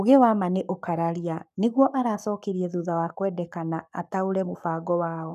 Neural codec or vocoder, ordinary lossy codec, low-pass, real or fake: codec, 44.1 kHz, 7.8 kbps, Pupu-Codec; none; 14.4 kHz; fake